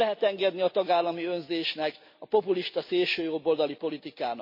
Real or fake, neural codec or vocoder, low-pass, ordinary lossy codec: real; none; 5.4 kHz; MP3, 32 kbps